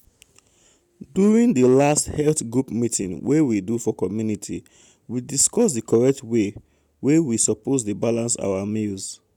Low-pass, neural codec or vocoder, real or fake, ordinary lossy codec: 19.8 kHz; none; real; none